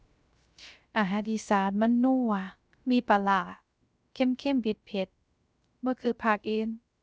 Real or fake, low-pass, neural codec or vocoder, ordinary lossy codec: fake; none; codec, 16 kHz, 0.3 kbps, FocalCodec; none